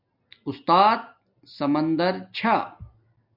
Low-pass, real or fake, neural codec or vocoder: 5.4 kHz; real; none